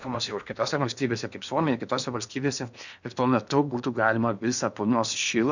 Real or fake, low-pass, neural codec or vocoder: fake; 7.2 kHz; codec, 16 kHz in and 24 kHz out, 0.8 kbps, FocalCodec, streaming, 65536 codes